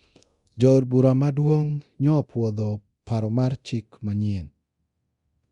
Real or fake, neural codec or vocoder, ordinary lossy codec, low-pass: fake; codec, 24 kHz, 0.9 kbps, DualCodec; Opus, 64 kbps; 10.8 kHz